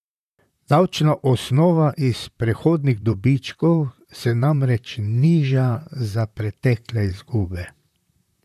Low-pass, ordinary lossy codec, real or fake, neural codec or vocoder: 14.4 kHz; none; fake; vocoder, 44.1 kHz, 128 mel bands, Pupu-Vocoder